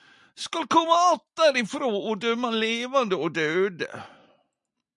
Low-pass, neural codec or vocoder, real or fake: 10.8 kHz; none; real